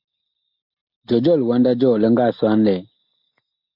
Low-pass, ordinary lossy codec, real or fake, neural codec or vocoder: 5.4 kHz; AAC, 48 kbps; real; none